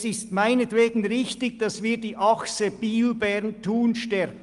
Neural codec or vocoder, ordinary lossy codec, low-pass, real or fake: none; Opus, 32 kbps; 10.8 kHz; real